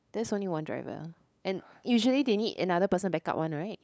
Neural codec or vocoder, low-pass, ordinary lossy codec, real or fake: codec, 16 kHz, 8 kbps, FunCodec, trained on LibriTTS, 25 frames a second; none; none; fake